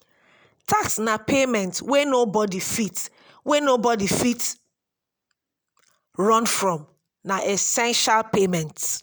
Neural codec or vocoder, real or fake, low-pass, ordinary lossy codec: none; real; none; none